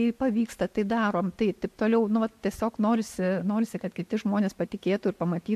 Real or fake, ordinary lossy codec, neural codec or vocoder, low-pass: real; MP3, 64 kbps; none; 14.4 kHz